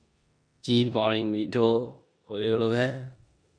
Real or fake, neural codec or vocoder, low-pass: fake; codec, 16 kHz in and 24 kHz out, 0.9 kbps, LongCat-Audio-Codec, four codebook decoder; 9.9 kHz